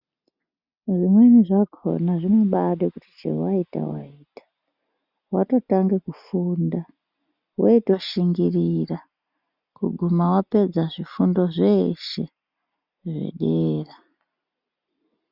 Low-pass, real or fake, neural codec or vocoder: 5.4 kHz; real; none